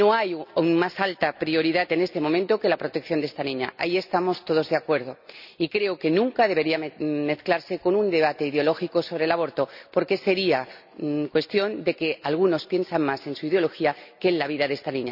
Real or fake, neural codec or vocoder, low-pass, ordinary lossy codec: real; none; 5.4 kHz; none